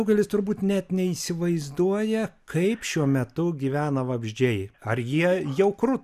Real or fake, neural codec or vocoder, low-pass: real; none; 14.4 kHz